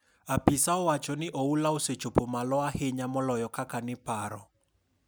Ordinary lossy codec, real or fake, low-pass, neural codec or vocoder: none; real; none; none